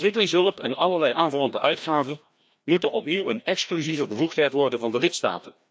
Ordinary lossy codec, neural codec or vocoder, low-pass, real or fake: none; codec, 16 kHz, 1 kbps, FreqCodec, larger model; none; fake